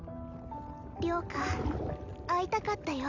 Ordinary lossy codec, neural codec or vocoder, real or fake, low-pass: none; none; real; 7.2 kHz